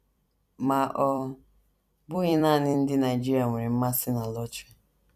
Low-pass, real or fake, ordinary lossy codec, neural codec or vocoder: 14.4 kHz; fake; none; vocoder, 44.1 kHz, 128 mel bands every 256 samples, BigVGAN v2